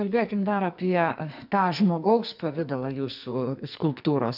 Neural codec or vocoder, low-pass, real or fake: codec, 44.1 kHz, 2.6 kbps, SNAC; 5.4 kHz; fake